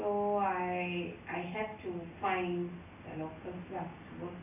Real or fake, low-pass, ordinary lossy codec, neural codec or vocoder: real; 3.6 kHz; none; none